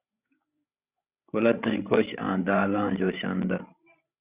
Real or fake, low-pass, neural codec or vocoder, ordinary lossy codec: fake; 3.6 kHz; codec, 16 kHz, 16 kbps, FreqCodec, larger model; Opus, 64 kbps